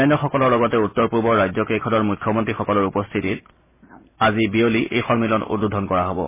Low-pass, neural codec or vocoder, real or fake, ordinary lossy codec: 3.6 kHz; none; real; MP3, 16 kbps